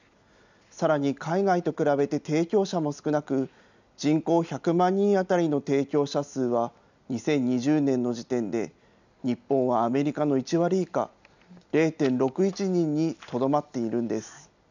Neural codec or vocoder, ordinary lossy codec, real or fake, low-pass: none; none; real; 7.2 kHz